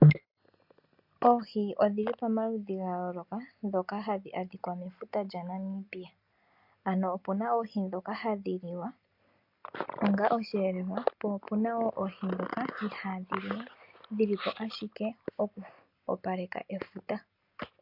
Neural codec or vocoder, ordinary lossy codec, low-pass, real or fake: none; MP3, 48 kbps; 5.4 kHz; real